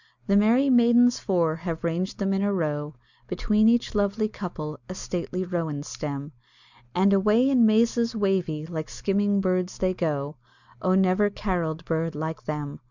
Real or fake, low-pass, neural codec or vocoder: real; 7.2 kHz; none